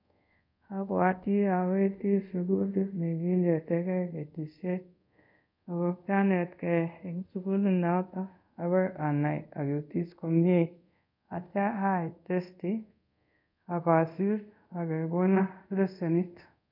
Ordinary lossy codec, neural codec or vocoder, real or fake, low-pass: none; codec, 24 kHz, 0.5 kbps, DualCodec; fake; 5.4 kHz